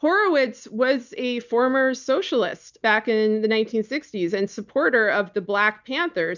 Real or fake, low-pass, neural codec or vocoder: real; 7.2 kHz; none